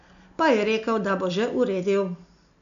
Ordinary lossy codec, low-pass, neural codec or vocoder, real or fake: none; 7.2 kHz; none; real